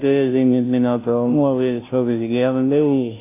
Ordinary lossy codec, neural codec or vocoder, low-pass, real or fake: AAC, 24 kbps; codec, 16 kHz, 0.5 kbps, FunCodec, trained on Chinese and English, 25 frames a second; 3.6 kHz; fake